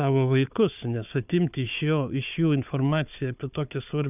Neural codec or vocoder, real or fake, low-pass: codec, 16 kHz, 4 kbps, FunCodec, trained on Chinese and English, 50 frames a second; fake; 3.6 kHz